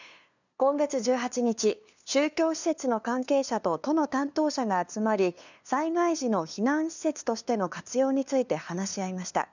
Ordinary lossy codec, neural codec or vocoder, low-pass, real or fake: none; codec, 16 kHz, 2 kbps, FunCodec, trained on LibriTTS, 25 frames a second; 7.2 kHz; fake